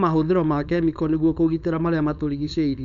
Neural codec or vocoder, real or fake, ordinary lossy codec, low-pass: codec, 16 kHz, 4.8 kbps, FACodec; fake; none; 7.2 kHz